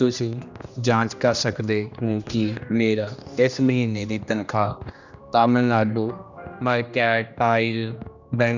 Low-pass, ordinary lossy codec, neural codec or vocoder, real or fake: 7.2 kHz; none; codec, 16 kHz, 1 kbps, X-Codec, HuBERT features, trained on general audio; fake